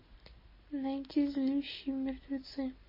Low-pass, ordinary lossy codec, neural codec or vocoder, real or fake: 5.4 kHz; MP3, 24 kbps; none; real